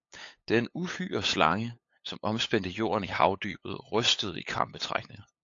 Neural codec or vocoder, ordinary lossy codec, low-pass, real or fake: codec, 16 kHz, 8 kbps, FunCodec, trained on LibriTTS, 25 frames a second; AAC, 48 kbps; 7.2 kHz; fake